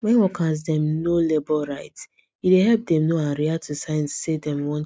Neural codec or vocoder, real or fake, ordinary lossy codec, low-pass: none; real; none; none